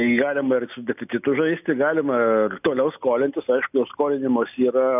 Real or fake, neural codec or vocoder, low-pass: real; none; 3.6 kHz